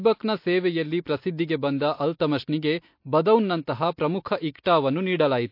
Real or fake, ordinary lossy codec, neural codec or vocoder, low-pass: real; MP3, 32 kbps; none; 5.4 kHz